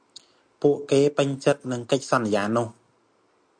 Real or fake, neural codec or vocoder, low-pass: real; none; 9.9 kHz